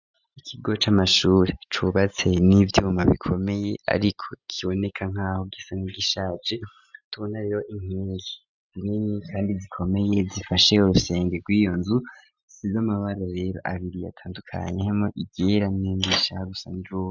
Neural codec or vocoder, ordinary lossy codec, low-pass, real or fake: none; Opus, 64 kbps; 7.2 kHz; real